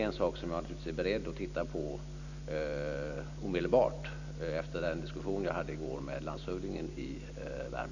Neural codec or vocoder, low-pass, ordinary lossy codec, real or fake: none; 7.2 kHz; none; real